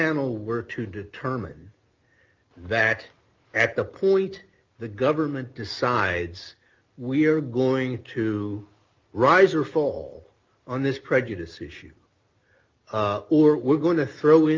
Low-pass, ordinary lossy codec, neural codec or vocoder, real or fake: 7.2 kHz; Opus, 24 kbps; none; real